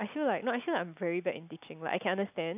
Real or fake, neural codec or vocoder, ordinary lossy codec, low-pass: real; none; none; 3.6 kHz